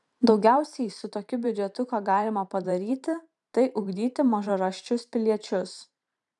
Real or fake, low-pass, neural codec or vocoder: fake; 10.8 kHz; vocoder, 44.1 kHz, 128 mel bands every 512 samples, BigVGAN v2